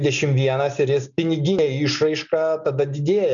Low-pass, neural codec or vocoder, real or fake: 7.2 kHz; none; real